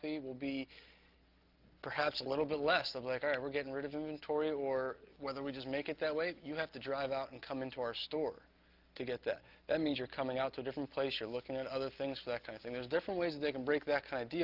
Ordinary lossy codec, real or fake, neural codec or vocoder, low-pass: Opus, 32 kbps; real; none; 5.4 kHz